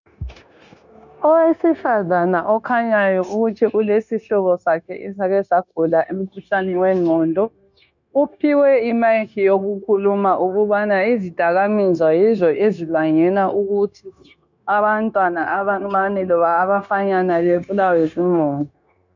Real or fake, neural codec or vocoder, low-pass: fake; codec, 16 kHz, 0.9 kbps, LongCat-Audio-Codec; 7.2 kHz